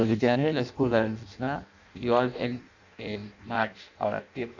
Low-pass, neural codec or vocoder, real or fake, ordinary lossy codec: 7.2 kHz; codec, 16 kHz in and 24 kHz out, 0.6 kbps, FireRedTTS-2 codec; fake; none